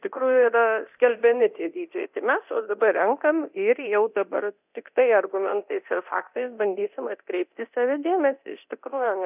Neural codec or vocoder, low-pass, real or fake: codec, 24 kHz, 0.9 kbps, DualCodec; 3.6 kHz; fake